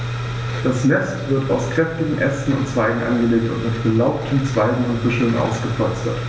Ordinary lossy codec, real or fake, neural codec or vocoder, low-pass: none; real; none; none